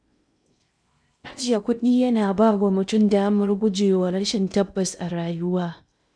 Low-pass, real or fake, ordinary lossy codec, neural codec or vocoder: 9.9 kHz; fake; none; codec, 16 kHz in and 24 kHz out, 0.6 kbps, FocalCodec, streaming, 2048 codes